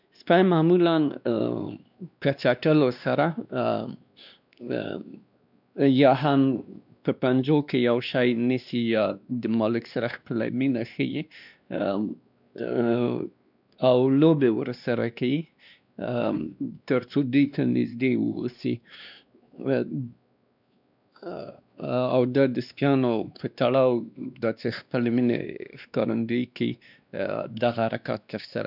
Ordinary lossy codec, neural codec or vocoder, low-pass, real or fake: none; codec, 16 kHz, 2 kbps, X-Codec, WavLM features, trained on Multilingual LibriSpeech; 5.4 kHz; fake